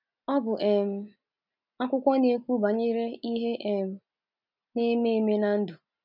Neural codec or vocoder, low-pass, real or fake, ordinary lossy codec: none; 5.4 kHz; real; none